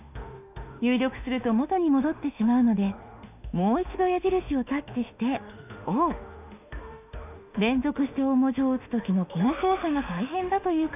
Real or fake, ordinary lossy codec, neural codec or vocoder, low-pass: fake; none; autoencoder, 48 kHz, 32 numbers a frame, DAC-VAE, trained on Japanese speech; 3.6 kHz